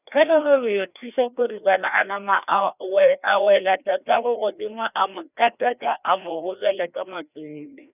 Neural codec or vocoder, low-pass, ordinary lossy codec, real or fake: codec, 16 kHz, 1 kbps, FreqCodec, larger model; 3.6 kHz; none; fake